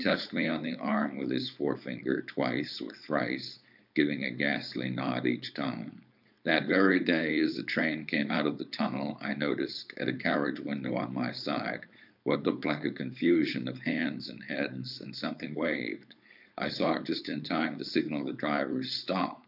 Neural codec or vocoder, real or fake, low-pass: codec, 16 kHz, 4.8 kbps, FACodec; fake; 5.4 kHz